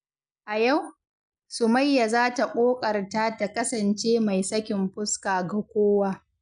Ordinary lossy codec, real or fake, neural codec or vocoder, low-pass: none; real; none; 9.9 kHz